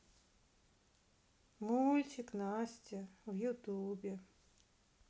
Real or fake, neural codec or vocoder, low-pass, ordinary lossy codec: real; none; none; none